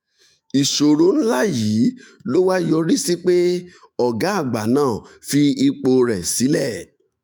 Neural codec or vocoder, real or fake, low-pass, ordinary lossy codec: autoencoder, 48 kHz, 128 numbers a frame, DAC-VAE, trained on Japanese speech; fake; none; none